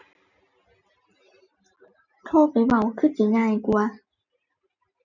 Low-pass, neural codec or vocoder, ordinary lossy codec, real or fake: 7.2 kHz; none; none; real